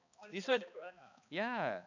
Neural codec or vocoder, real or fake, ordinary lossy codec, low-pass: codec, 16 kHz, 2 kbps, X-Codec, HuBERT features, trained on balanced general audio; fake; none; 7.2 kHz